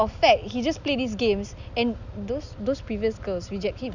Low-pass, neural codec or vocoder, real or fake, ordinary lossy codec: 7.2 kHz; none; real; none